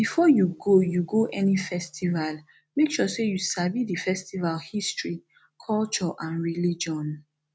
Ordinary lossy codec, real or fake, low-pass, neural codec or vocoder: none; real; none; none